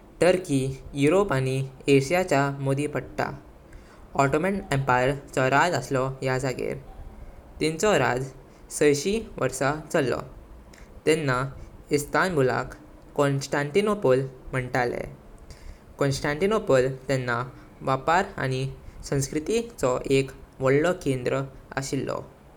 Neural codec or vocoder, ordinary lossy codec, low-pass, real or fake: none; none; 19.8 kHz; real